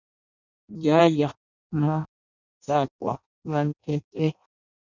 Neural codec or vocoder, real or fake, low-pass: codec, 16 kHz in and 24 kHz out, 0.6 kbps, FireRedTTS-2 codec; fake; 7.2 kHz